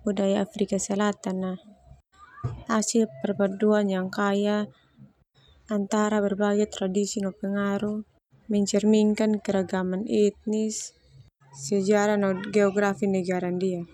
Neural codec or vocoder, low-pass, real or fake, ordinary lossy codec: none; 19.8 kHz; real; none